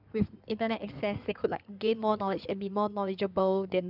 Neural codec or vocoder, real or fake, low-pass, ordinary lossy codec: codec, 16 kHz in and 24 kHz out, 2.2 kbps, FireRedTTS-2 codec; fake; 5.4 kHz; none